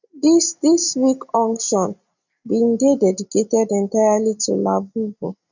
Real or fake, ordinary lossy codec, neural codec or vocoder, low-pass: real; none; none; 7.2 kHz